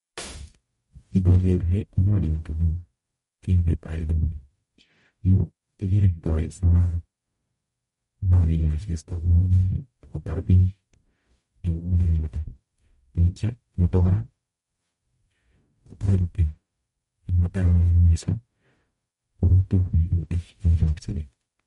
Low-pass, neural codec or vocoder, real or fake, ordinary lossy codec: 19.8 kHz; codec, 44.1 kHz, 0.9 kbps, DAC; fake; MP3, 48 kbps